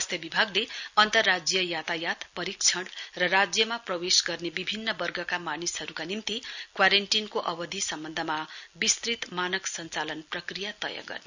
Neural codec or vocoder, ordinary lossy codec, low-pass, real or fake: none; none; 7.2 kHz; real